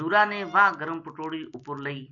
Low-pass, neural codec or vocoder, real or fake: 7.2 kHz; none; real